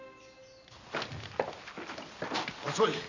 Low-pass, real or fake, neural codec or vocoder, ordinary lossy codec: 7.2 kHz; real; none; none